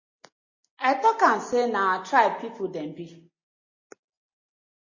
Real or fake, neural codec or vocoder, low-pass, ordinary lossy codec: real; none; 7.2 kHz; MP3, 32 kbps